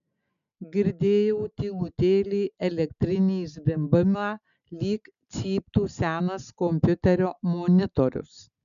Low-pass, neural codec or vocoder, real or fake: 7.2 kHz; none; real